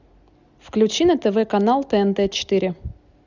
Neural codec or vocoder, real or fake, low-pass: none; real; 7.2 kHz